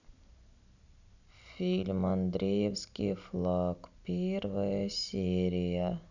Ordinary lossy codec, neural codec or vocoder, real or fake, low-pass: none; none; real; 7.2 kHz